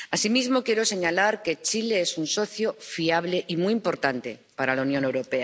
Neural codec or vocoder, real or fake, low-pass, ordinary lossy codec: none; real; none; none